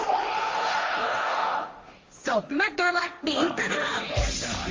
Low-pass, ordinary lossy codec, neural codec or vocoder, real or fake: 7.2 kHz; Opus, 32 kbps; codec, 16 kHz, 1.1 kbps, Voila-Tokenizer; fake